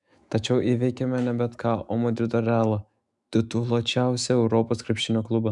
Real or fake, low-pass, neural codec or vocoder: fake; 10.8 kHz; autoencoder, 48 kHz, 128 numbers a frame, DAC-VAE, trained on Japanese speech